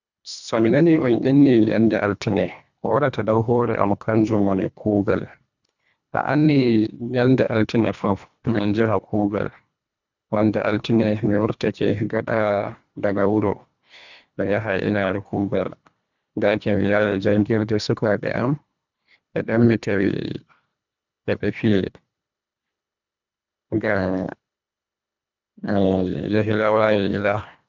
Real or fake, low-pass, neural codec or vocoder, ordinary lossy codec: fake; 7.2 kHz; codec, 24 kHz, 1.5 kbps, HILCodec; none